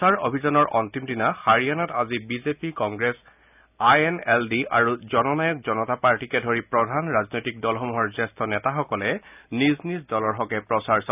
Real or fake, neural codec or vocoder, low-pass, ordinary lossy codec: real; none; 3.6 kHz; none